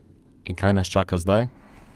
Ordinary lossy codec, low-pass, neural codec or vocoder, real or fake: Opus, 32 kbps; 14.4 kHz; codec, 32 kHz, 1.9 kbps, SNAC; fake